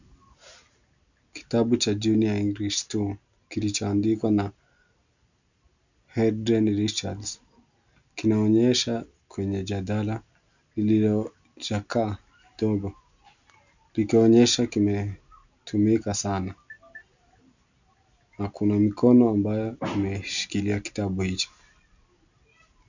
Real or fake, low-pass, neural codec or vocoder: real; 7.2 kHz; none